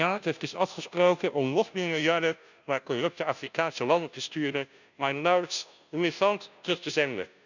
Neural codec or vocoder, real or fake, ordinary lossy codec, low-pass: codec, 16 kHz, 0.5 kbps, FunCodec, trained on Chinese and English, 25 frames a second; fake; none; 7.2 kHz